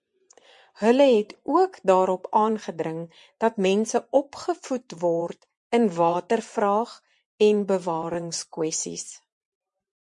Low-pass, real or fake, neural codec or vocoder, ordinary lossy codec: 10.8 kHz; fake; vocoder, 24 kHz, 100 mel bands, Vocos; MP3, 64 kbps